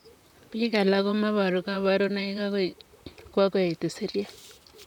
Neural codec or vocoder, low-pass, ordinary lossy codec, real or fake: vocoder, 44.1 kHz, 128 mel bands, Pupu-Vocoder; 19.8 kHz; none; fake